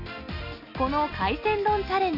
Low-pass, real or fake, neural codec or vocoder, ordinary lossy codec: 5.4 kHz; real; none; none